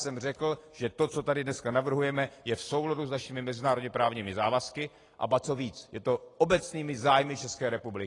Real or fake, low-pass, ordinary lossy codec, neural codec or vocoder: real; 10.8 kHz; AAC, 32 kbps; none